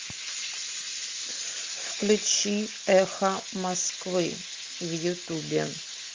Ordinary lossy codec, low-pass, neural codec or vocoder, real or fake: Opus, 32 kbps; 7.2 kHz; none; real